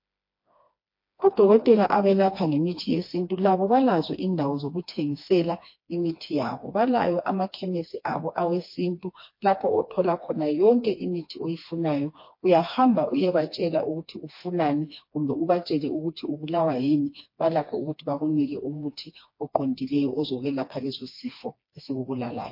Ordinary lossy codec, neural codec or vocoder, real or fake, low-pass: MP3, 32 kbps; codec, 16 kHz, 2 kbps, FreqCodec, smaller model; fake; 5.4 kHz